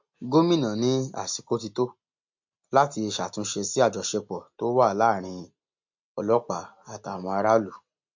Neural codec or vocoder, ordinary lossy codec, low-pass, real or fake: none; MP3, 48 kbps; 7.2 kHz; real